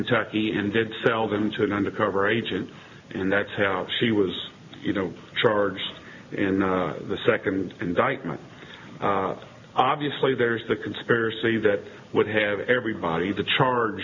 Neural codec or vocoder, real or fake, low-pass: none; real; 7.2 kHz